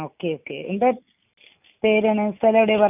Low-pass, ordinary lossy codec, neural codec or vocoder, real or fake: 3.6 kHz; none; none; real